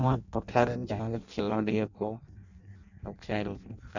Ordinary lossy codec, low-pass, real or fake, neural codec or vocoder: none; 7.2 kHz; fake; codec, 16 kHz in and 24 kHz out, 0.6 kbps, FireRedTTS-2 codec